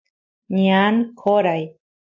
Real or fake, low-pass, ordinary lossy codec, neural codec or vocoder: real; 7.2 kHz; AAC, 48 kbps; none